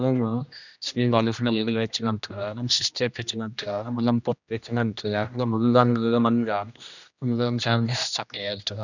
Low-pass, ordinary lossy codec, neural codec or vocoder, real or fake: 7.2 kHz; none; codec, 16 kHz, 1 kbps, X-Codec, HuBERT features, trained on general audio; fake